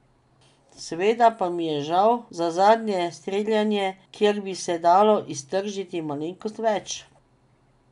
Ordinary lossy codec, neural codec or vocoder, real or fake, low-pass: none; none; real; 10.8 kHz